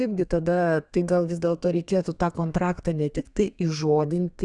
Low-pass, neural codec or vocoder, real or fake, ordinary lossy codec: 10.8 kHz; codec, 32 kHz, 1.9 kbps, SNAC; fake; Opus, 64 kbps